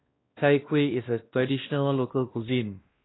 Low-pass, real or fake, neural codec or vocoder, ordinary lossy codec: 7.2 kHz; fake; codec, 16 kHz, 1 kbps, X-Codec, WavLM features, trained on Multilingual LibriSpeech; AAC, 16 kbps